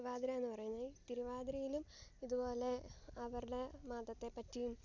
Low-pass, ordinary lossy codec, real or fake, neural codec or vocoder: 7.2 kHz; none; real; none